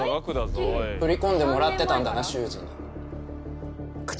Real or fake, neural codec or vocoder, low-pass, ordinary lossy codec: real; none; none; none